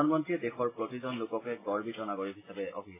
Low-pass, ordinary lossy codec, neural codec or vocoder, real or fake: 3.6 kHz; AAC, 16 kbps; none; real